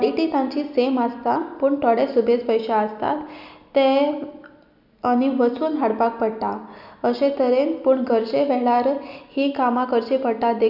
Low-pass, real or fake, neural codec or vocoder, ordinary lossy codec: 5.4 kHz; real; none; none